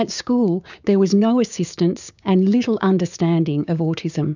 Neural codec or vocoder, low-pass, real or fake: codec, 16 kHz, 6 kbps, DAC; 7.2 kHz; fake